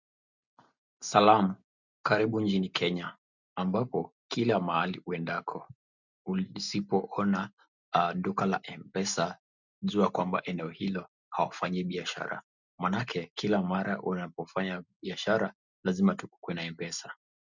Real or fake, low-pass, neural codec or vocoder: real; 7.2 kHz; none